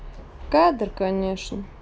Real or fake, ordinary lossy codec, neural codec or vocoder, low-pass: real; none; none; none